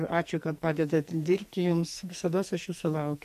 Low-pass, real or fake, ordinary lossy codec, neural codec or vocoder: 14.4 kHz; fake; MP3, 96 kbps; codec, 44.1 kHz, 2.6 kbps, SNAC